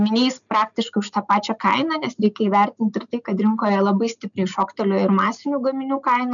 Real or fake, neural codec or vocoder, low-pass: real; none; 7.2 kHz